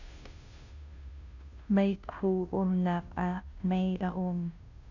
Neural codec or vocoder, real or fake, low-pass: codec, 16 kHz, 0.5 kbps, FunCodec, trained on Chinese and English, 25 frames a second; fake; 7.2 kHz